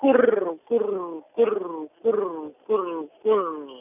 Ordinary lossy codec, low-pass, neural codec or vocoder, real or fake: none; 3.6 kHz; none; real